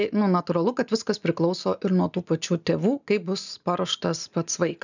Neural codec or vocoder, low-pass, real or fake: none; 7.2 kHz; real